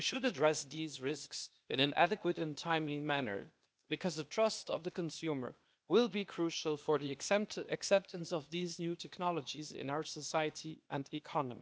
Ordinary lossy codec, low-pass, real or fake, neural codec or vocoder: none; none; fake; codec, 16 kHz, 0.8 kbps, ZipCodec